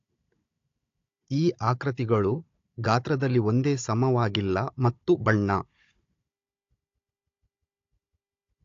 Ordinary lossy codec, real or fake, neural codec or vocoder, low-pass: AAC, 48 kbps; fake; codec, 16 kHz, 16 kbps, FunCodec, trained on Chinese and English, 50 frames a second; 7.2 kHz